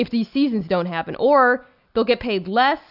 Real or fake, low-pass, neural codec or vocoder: real; 5.4 kHz; none